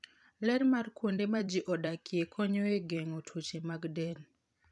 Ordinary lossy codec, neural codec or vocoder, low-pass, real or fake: none; vocoder, 44.1 kHz, 128 mel bands every 256 samples, BigVGAN v2; 10.8 kHz; fake